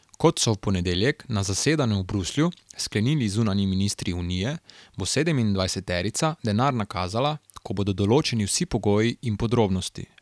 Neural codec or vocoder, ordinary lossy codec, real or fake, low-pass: none; none; real; none